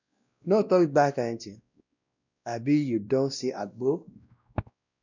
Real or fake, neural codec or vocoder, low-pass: fake; codec, 16 kHz, 1 kbps, X-Codec, WavLM features, trained on Multilingual LibriSpeech; 7.2 kHz